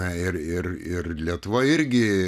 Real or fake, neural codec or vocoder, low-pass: real; none; 14.4 kHz